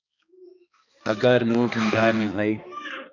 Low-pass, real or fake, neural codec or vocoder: 7.2 kHz; fake; codec, 16 kHz, 2 kbps, X-Codec, HuBERT features, trained on balanced general audio